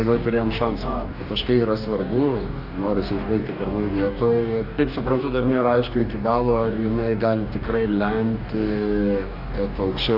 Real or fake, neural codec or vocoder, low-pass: fake; codec, 44.1 kHz, 2.6 kbps, DAC; 5.4 kHz